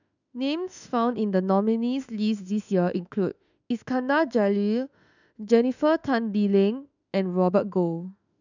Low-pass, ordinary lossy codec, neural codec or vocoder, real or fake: 7.2 kHz; none; autoencoder, 48 kHz, 32 numbers a frame, DAC-VAE, trained on Japanese speech; fake